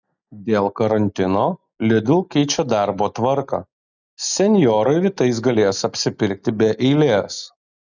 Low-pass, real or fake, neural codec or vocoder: 7.2 kHz; real; none